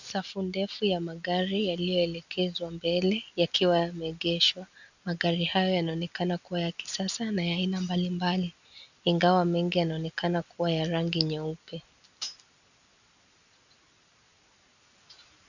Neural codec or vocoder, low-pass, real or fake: none; 7.2 kHz; real